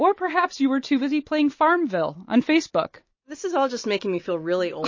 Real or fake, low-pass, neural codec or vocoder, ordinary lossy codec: real; 7.2 kHz; none; MP3, 32 kbps